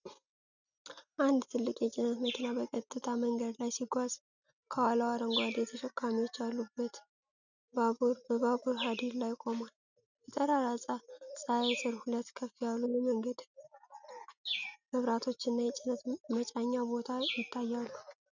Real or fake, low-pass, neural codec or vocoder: real; 7.2 kHz; none